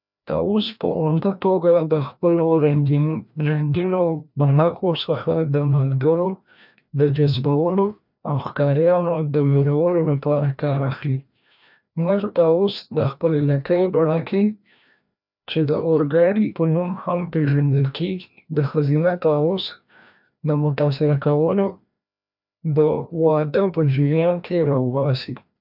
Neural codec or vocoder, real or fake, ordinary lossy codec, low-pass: codec, 16 kHz, 1 kbps, FreqCodec, larger model; fake; none; 5.4 kHz